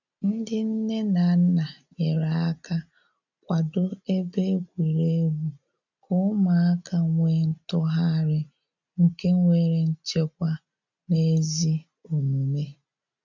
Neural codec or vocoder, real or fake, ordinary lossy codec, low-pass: none; real; none; 7.2 kHz